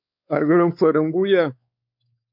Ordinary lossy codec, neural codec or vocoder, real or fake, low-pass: MP3, 48 kbps; codec, 16 kHz, 4 kbps, X-Codec, WavLM features, trained on Multilingual LibriSpeech; fake; 5.4 kHz